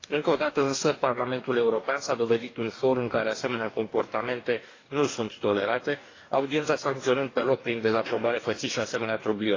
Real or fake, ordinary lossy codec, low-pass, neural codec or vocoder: fake; AAC, 32 kbps; 7.2 kHz; codec, 44.1 kHz, 2.6 kbps, DAC